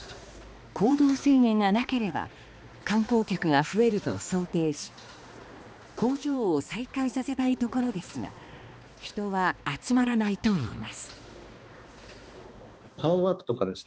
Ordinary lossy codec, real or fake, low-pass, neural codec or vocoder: none; fake; none; codec, 16 kHz, 2 kbps, X-Codec, HuBERT features, trained on balanced general audio